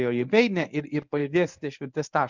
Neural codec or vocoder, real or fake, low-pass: codec, 24 kHz, 0.9 kbps, WavTokenizer, medium speech release version 1; fake; 7.2 kHz